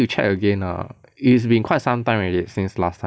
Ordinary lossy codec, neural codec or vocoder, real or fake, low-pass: none; none; real; none